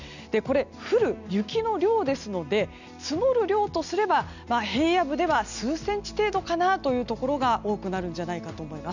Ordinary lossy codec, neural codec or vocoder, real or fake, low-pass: none; none; real; 7.2 kHz